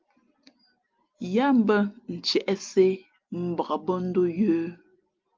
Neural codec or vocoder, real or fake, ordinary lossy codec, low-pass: none; real; Opus, 32 kbps; 7.2 kHz